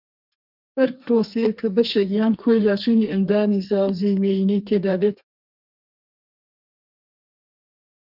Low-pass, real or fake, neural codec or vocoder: 5.4 kHz; fake; codec, 32 kHz, 1.9 kbps, SNAC